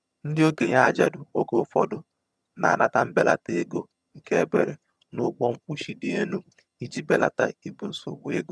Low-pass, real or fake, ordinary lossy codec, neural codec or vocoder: none; fake; none; vocoder, 22.05 kHz, 80 mel bands, HiFi-GAN